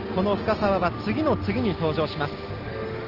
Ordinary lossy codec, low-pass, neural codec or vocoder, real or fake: Opus, 16 kbps; 5.4 kHz; none; real